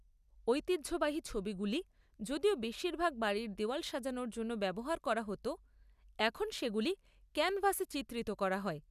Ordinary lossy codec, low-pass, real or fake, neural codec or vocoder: none; 14.4 kHz; real; none